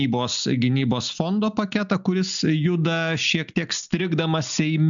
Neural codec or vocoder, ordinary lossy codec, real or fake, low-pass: none; MP3, 96 kbps; real; 7.2 kHz